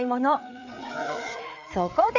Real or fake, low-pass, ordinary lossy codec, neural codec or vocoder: fake; 7.2 kHz; none; codec, 16 kHz, 4 kbps, FreqCodec, larger model